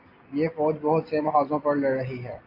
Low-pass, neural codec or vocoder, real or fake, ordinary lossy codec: 5.4 kHz; none; real; AAC, 32 kbps